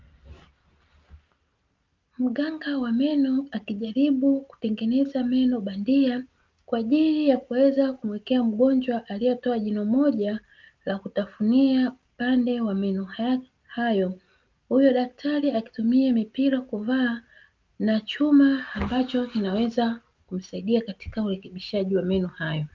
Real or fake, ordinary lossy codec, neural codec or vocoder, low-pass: real; Opus, 24 kbps; none; 7.2 kHz